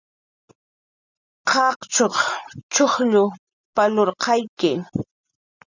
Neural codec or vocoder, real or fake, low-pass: none; real; 7.2 kHz